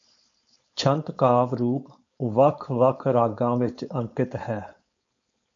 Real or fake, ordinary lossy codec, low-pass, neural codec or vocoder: fake; MP3, 64 kbps; 7.2 kHz; codec, 16 kHz, 4.8 kbps, FACodec